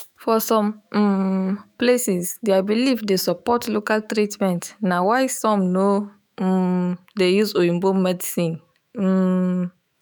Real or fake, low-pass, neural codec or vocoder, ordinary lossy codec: fake; none; autoencoder, 48 kHz, 128 numbers a frame, DAC-VAE, trained on Japanese speech; none